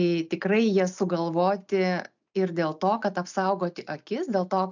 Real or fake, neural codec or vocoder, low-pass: real; none; 7.2 kHz